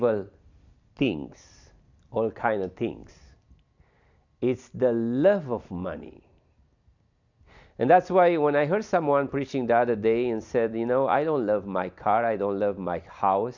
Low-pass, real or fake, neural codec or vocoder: 7.2 kHz; real; none